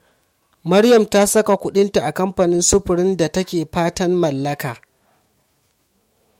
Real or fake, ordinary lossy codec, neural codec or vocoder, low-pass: fake; MP3, 64 kbps; autoencoder, 48 kHz, 128 numbers a frame, DAC-VAE, trained on Japanese speech; 19.8 kHz